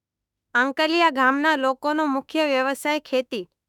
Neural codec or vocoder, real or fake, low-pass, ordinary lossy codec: autoencoder, 48 kHz, 32 numbers a frame, DAC-VAE, trained on Japanese speech; fake; 19.8 kHz; none